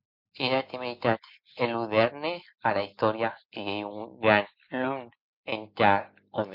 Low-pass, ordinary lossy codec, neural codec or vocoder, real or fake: 5.4 kHz; MP3, 48 kbps; none; real